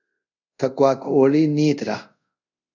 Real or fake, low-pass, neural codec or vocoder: fake; 7.2 kHz; codec, 24 kHz, 0.5 kbps, DualCodec